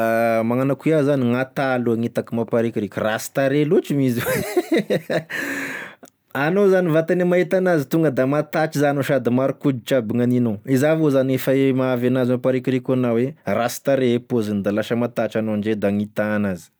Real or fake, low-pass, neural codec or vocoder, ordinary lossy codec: real; none; none; none